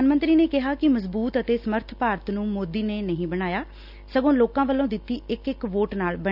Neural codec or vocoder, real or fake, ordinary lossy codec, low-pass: none; real; none; 5.4 kHz